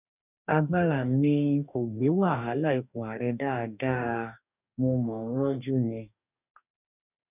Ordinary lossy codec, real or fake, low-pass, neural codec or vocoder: none; fake; 3.6 kHz; codec, 44.1 kHz, 2.6 kbps, DAC